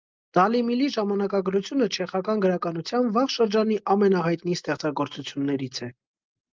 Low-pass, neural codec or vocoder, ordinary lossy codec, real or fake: 7.2 kHz; none; Opus, 32 kbps; real